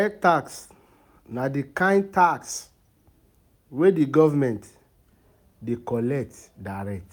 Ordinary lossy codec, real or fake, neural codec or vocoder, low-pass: none; real; none; none